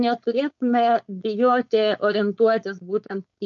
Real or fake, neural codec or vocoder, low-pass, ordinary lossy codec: fake; codec, 16 kHz, 4.8 kbps, FACodec; 7.2 kHz; MP3, 48 kbps